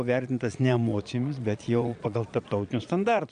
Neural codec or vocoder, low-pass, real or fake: vocoder, 22.05 kHz, 80 mel bands, Vocos; 9.9 kHz; fake